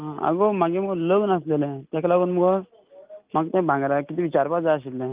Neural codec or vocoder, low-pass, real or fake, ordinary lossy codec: none; 3.6 kHz; real; Opus, 24 kbps